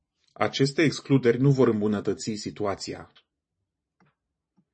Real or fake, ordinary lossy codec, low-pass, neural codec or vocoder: fake; MP3, 32 kbps; 9.9 kHz; vocoder, 44.1 kHz, 128 mel bands every 512 samples, BigVGAN v2